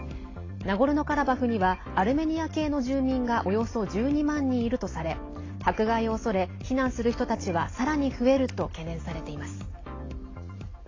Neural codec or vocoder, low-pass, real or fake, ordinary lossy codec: none; 7.2 kHz; real; AAC, 32 kbps